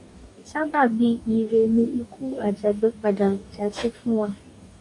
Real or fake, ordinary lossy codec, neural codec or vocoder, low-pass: fake; MP3, 48 kbps; codec, 44.1 kHz, 2.6 kbps, DAC; 10.8 kHz